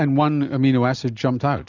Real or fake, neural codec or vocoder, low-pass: real; none; 7.2 kHz